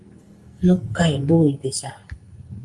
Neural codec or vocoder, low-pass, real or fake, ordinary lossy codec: codec, 44.1 kHz, 2.6 kbps, SNAC; 10.8 kHz; fake; Opus, 32 kbps